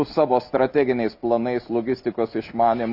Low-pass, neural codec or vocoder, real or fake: 5.4 kHz; none; real